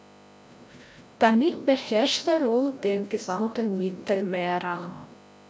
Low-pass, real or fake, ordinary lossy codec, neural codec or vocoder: none; fake; none; codec, 16 kHz, 0.5 kbps, FreqCodec, larger model